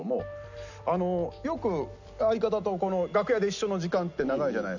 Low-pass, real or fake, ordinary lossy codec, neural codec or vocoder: 7.2 kHz; real; none; none